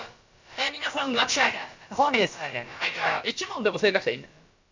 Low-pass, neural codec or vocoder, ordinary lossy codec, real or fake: 7.2 kHz; codec, 16 kHz, about 1 kbps, DyCAST, with the encoder's durations; MP3, 64 kbps; fake